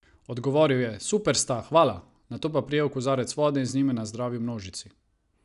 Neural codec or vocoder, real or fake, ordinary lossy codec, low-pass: none; real; none; 10.8 kHz